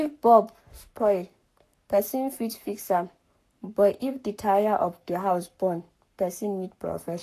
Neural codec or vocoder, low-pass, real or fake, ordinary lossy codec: codec, 44.1 kHz, 7.8 kbps, Pupu-Codec; 14.4 kHz; fake; AAC, 64 kbps